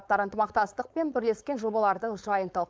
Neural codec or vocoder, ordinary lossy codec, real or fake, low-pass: codec, 16 kHz, 4.8 kbps, FACodec; none; fake; none